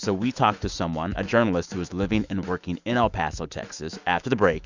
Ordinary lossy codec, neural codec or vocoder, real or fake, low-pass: Opus, 64 kbps; autoencoder, 48 kHz, 128 numbers a frame, DAC-VAE, trained on Japanese speech; fake; 7.2 kHz